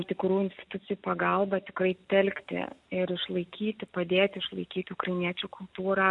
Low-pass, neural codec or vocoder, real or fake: 10.8 kHz; none; real